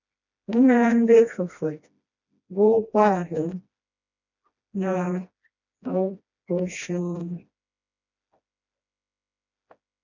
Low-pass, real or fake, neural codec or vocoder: 7.2 kHz; fake; codec, 16 kHz, 1 kbps, FreqCodec, smaller model